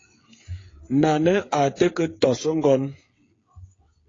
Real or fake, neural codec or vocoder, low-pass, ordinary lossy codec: fake; codec, 16 kHz, 8 kbps, FreqCodec, smaller model; 7.2 kHz; AAC, 32 kbps